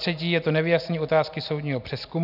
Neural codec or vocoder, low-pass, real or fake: none; 5.4 kHz; real